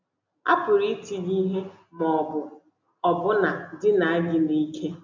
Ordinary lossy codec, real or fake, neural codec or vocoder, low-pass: none; real; none; 7.2 kHz